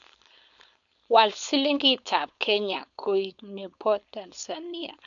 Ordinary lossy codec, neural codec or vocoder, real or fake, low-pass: none; codec, 16 kHz, 4.8 kbps, FACodec; fake; 7.2 kHz